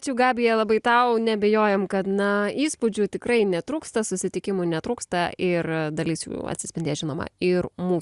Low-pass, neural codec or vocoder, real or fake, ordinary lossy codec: 10.8 kHz; none; real; Opus, 64 kbps